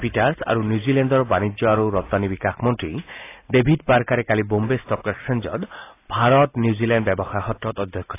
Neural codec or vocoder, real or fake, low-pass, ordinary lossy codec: none; real; 3.6 kHz; AAC, 24 kbps